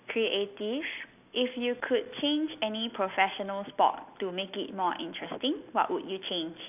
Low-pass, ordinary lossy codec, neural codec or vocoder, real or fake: 3.6 kHz; none; none; real